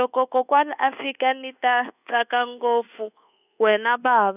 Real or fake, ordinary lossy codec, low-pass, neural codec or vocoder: fake; none; 3.6 kHz; codec, 24 kHz, 1.2 kbps, DualCodec